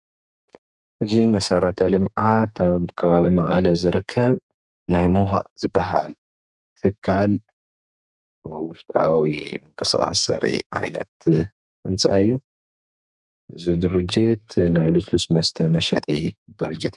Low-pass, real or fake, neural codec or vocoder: 10.8 kHz; fake; codec, 32 kHz, 1.9 kbps, SNAC